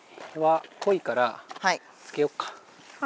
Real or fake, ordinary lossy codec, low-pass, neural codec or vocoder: real; none; none; none